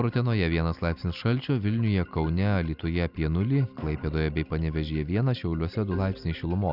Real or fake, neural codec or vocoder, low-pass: real; none; 5.4 kHz